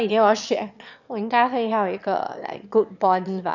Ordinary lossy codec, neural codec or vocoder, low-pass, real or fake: none; autoencoder, 22.05 kHz, a latent of 192 numbers a frame, VITS, trained on one speaker; 7.2 kHz; fake